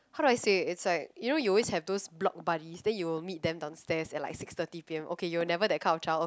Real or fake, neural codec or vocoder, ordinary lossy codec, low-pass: real; none; none; none